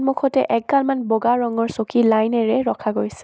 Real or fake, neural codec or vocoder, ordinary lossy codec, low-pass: real; none; none; none